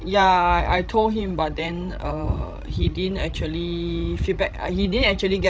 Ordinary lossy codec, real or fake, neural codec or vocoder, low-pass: none; fake; codec, 16 kHz, 16 kbps, FreqCodec, larger model; none